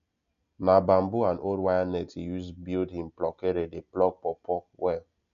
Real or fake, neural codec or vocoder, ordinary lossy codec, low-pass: real; none; none; 7.2 kHz